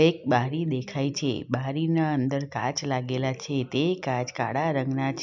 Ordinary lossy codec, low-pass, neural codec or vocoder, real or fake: MP3, 64 kbps; 7.2 kHz; none; real